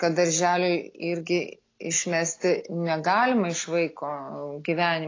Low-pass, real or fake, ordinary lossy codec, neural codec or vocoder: 7.2 kHz; real; AAC, 32 kbps; none